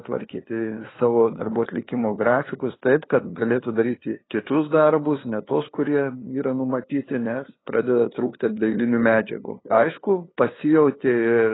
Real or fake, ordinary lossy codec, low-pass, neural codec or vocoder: fake; AAC, 16 kbps; 7.2 kHz; codec, 16 kHz, 2 kbps, FunCodec, trained on LibriTTS, 25 frames a second